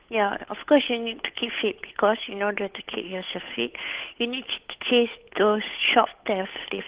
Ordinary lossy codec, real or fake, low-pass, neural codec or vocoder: Opus, 16 kbps; fake; 3.6 kHz; codec, 16 kHz, 8 kbps, FunCodec, trained on Chinese and English, 25 frames a second